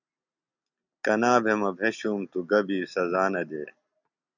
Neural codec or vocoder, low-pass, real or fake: none; 7.2 kHz; real